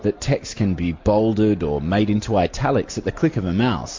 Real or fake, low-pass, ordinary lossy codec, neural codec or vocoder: real; 7.2 kHz; MP3, 48 kbps; none